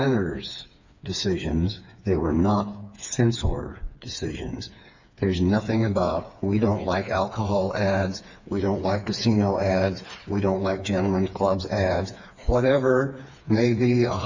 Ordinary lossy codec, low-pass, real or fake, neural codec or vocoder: MP3, 64 kbps; 7.2 kHz; fake; codec, 16 kHz, 4 kbps, FreqCodec, smaller model